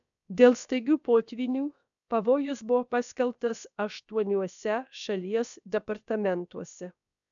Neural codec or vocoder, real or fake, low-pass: codec, 16 kHz, about 1 kbps, DyCAST, with the encoder's durations; fake; 7.2 kHz